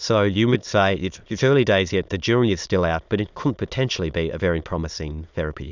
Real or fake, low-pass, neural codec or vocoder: fake; 7.2 kHz; autoencoder, 22.05 kHz, a latent of 192 numbers a frame, VITS, trained on many speakers